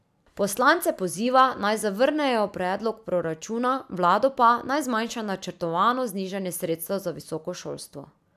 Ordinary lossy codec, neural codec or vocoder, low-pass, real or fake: none; none; 14.4 kHz; real